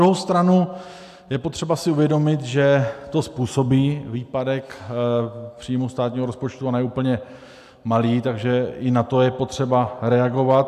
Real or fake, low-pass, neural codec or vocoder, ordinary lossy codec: real; 14.4 kHz; none; AAC, 96 kbps